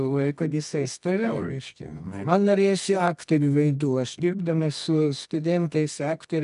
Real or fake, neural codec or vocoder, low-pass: fake; codec, 24 kHz, 0.9 kbps, WavTokenizer, medium music audio release; 10.8 kHz